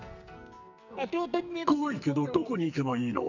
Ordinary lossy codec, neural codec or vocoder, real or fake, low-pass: none; codec, 44.1 kHz, 2.6 kbps, SNAC; fake; 7.2 kHz